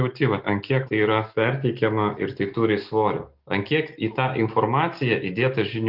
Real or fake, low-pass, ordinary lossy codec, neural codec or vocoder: real; 5.4 kHz; Opus, 32 kbps; none